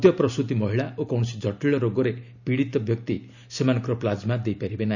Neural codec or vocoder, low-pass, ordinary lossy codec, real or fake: none; 7.2 kHz; none; real